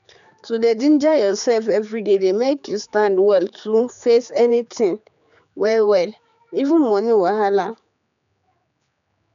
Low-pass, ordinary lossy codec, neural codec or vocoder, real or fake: 7.2 kHz; none; codec, 16 kHz, 4 kbps, X-Codec, HuBERT features, trained on general audio; fake